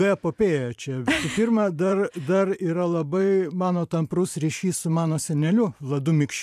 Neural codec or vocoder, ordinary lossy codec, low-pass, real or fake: vocoder, 44.1 kHz, 128 mel bands every 512 samples, BigVGAN v2; AAC, 96 kbps; 14.4 kHz; fake